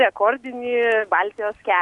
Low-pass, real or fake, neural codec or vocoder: 10.8 kHz; real; none